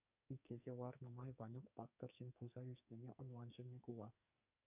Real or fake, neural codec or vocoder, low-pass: fake; codec, 44.1 kHz, 2.6 kbps, SNAC; 3.6 kHz